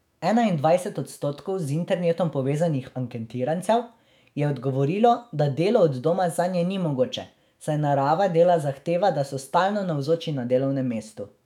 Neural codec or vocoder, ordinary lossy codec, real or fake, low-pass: autoencoder, 48 kHz, 128 numbers a frame, DAC-VAE, trained on Japanese speech; none; fake; 19.8 kHz